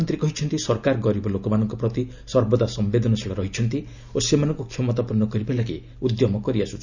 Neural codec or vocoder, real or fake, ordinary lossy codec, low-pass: none; real; none; 7.2 kHz